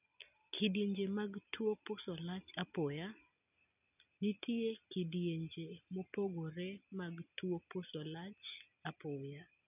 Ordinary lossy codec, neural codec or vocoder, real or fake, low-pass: none; none; real; 3.6 kHz